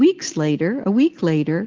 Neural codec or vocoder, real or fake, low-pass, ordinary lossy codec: none; real; 7.2 kHz; Opus, 16 kbps